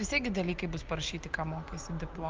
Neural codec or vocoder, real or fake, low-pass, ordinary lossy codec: none; real; 7.2 kHz; Opus, 24 kbps